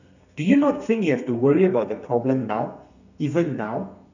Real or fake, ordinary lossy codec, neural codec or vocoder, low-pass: fake; none; codec, 32 kHz, 1.9 kbps, SNAC; 7.2 kHz